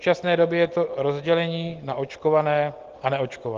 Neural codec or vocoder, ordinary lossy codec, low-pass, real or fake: none; Opus, 16 kbps; 7.2 kHz; real